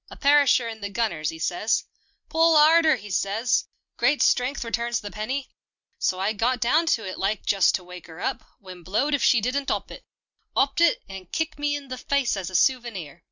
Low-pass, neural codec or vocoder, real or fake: 7.2 kHz; none; real